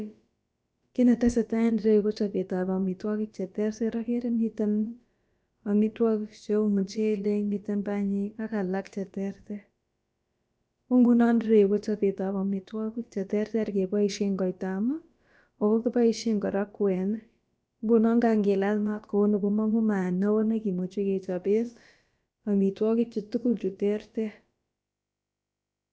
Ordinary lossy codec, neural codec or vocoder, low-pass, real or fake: none; codec, 16 kHz, about 1 kbps, DyCAST, with the encoder's durations; none; fake